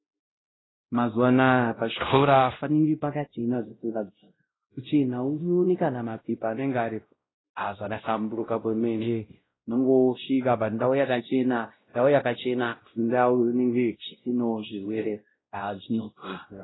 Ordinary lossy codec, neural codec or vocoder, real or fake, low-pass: AAC, 16 kbps; codec, 16 kHz, 0.5 kbps, X-Codec, WavLM features, trained on Multilingual LibriSpeech; fake; 7.2 kHz